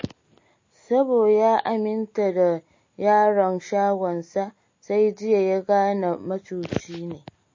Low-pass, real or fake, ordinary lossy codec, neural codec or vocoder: 7.2 kHz; real; MP3, 32 kbps; none